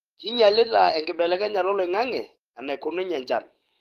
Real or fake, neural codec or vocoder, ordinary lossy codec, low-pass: fake; codec, 44.1 kHz, 7.8 kbps, DAC; Opus, 24 kbps; 14.4 kHz